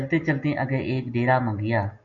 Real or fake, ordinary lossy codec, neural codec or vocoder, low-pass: real; MP3, 48 kbps; none; 7.2 kHz